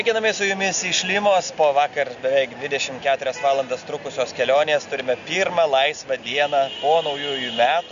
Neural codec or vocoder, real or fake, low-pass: none; real; 7.2 kHz